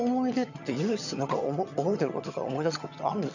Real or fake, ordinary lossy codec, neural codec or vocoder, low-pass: fake; none; vocoder, 22.05 kHz, 80 mel bands, HiFi-GAN; 7.2 kHz